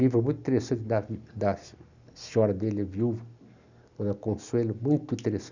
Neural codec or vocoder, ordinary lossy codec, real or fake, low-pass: none; none; real; 7.2 kHz